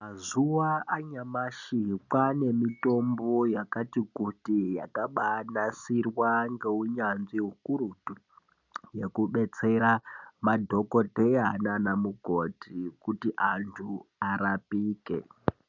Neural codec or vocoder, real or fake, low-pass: none; real; 7.2 kHz